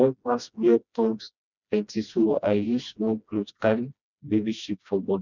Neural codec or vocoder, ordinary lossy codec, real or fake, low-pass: codec, 16 kHz, 1 kbps, FreqCodec, smaller model; none; fake; 7.2 kHz